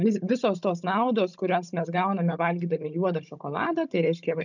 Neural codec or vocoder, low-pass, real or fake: codec, 16 kHz, 16 kbps, FunCodec, trained on Chinese and English, 50 frames a second; 7.2 kHz; fake